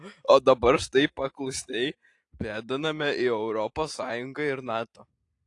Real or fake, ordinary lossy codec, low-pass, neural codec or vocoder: real; AAC, 48 kbps; 10.8 kHz; none